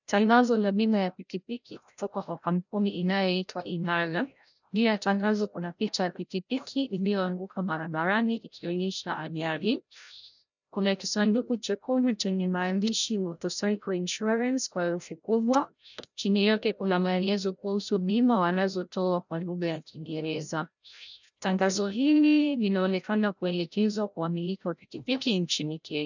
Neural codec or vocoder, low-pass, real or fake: codec, 16 kHz, 0.5 kbps, FreqCodec, larger model; 7.2 kHz; fake